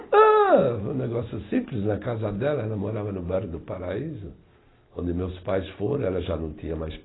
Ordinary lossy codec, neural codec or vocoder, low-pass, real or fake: AAC, 16 kbps; none; 7.2 kHz; real